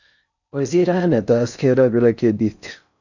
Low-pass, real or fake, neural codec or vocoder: 7.2 kHz; fake; codec, 16 kHz in and 24 kHz out, 0.6 kbps, FocalCodec, streaming, 4096 codes